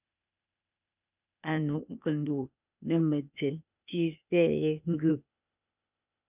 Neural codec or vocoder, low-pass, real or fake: codec, 16 kHz, 0.8 kbps, ZipCodec; 3.6 kHz; fake